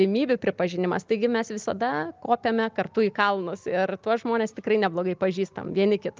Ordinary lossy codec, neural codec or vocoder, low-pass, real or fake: Opus, 24 kbps; none; 7.2 kHz; real